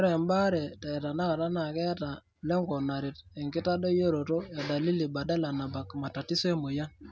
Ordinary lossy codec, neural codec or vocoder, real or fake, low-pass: none; none; real; none